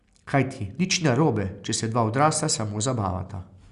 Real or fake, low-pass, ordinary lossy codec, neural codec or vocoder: real; 10.8 kHz; Opus, 64 kbps; none